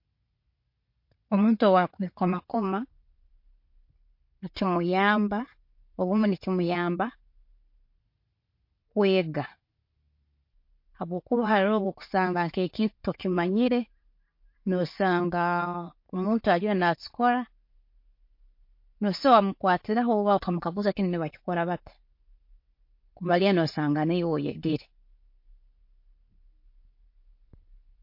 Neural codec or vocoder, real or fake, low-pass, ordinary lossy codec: none; real; 5.4 kHz; MP3, 32 kbps